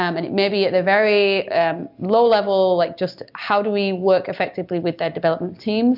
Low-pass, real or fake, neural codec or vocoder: 5.4 kHz; real; none